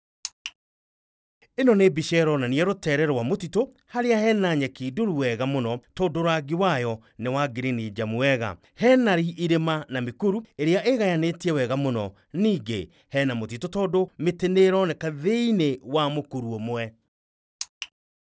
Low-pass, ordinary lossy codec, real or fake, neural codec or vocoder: none; none; real; none